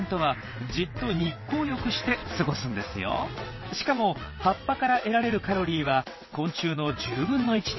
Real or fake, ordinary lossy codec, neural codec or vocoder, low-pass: fake; MP3, 24 kbps; vocoder, 22.05 kHz, 80 mel bands, WaveNeXt; 7.2 kHz